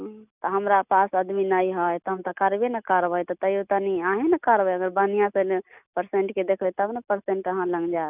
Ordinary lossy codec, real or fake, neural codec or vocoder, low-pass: none; real; none; 3.6 kHz